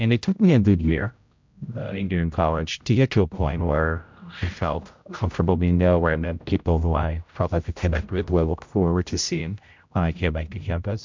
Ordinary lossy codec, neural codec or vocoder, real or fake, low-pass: MP3, 64 kbps; codec, 16 kHz, 0.5 kbps, X-Codec, HuBERT features, trained on general audio; fake; 7.2 kHz